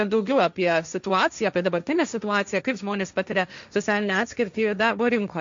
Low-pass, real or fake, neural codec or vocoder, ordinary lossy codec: 7.2 kHz; fake; codec, 16 kHz, 1.1 kbps, Voila-Tokenizer; MP3, 64 kbps